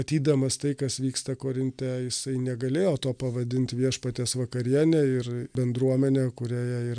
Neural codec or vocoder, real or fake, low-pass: none; real; 9.9 kHz